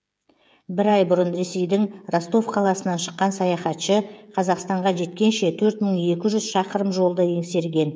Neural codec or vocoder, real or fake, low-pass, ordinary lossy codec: codec, 16 kHz, 16 kbps, FreqCodec, smaller model; fake; none; none